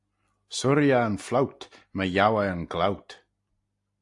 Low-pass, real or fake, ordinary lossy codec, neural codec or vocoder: 10.8 kHz; real; AAC, 64 kbps; none